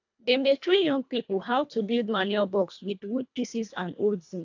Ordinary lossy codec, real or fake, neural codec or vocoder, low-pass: AAC, 48 kbps; fake; codec, 24 kHz, 1.5 kbps, HILCodec; 7.2 kHz